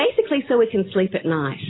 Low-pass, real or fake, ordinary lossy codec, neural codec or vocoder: 7.2 kHz; real; AAC, 16 kbps; none